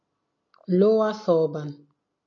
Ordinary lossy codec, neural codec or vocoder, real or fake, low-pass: MP3, 48 kbps; none; real; 7.2 kHz